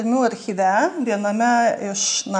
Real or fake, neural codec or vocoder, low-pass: real; none; 9.9 kHz